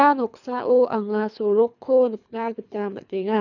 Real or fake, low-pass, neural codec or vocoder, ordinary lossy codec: fake; 7.2 kHz; codec, 24 kHz, 3 kbps, HILCodec; none